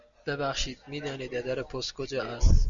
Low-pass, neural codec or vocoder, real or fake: 7.2 kHz; none; real